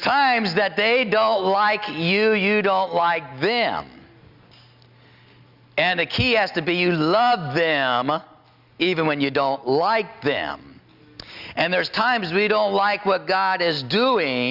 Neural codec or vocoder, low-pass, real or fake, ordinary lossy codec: none; 5.4 kHz; real; Opus, 64 kbps